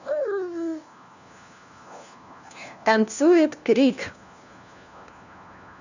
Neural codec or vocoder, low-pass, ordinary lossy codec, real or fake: codec, 16 kHz, 1 kbps, FunCodec, trained on LibriTTS, 50 frames a second; 7.2 kHz; none; fake